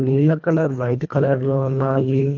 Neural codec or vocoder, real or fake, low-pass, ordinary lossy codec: codec, 24 kHz, 1.5 kbps, HILCodec; fake; 7.2 kHz; none